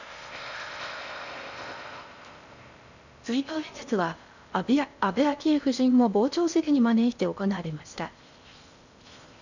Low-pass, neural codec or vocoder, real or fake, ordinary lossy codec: 7.2 kHz; codec, 16 kHz in and 24 kHz out, 0.6 kbps, FocalCodec, streaming, 4096 codes; fake; none